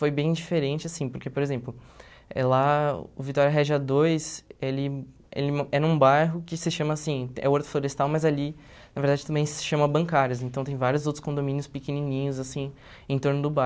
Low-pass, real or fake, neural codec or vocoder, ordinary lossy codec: none; real; none; none